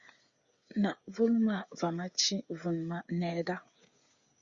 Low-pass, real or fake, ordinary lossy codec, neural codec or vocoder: 7.2 kHz; fake; Opus, 64 kbps; codec, 16 kHz, 8 kbps, FreqCodec, smaller model